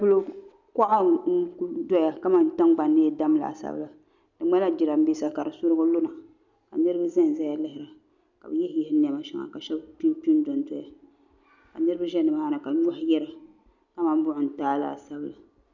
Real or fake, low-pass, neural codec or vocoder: real; 7.2 kHz; none